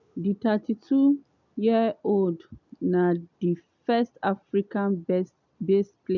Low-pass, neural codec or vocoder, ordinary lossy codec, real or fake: 7.2 kHz; none; none; real